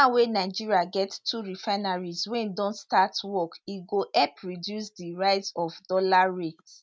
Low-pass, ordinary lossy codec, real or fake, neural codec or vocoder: none; none; real; none